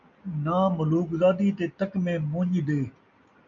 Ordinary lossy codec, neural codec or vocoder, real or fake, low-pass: MP3, 96 kbps; none; real; 7.2 kHz